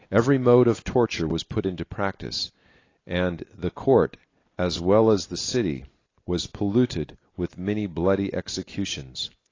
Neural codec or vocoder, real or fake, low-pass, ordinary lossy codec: none; real; 7.2 kHz; AAC, 32 kbps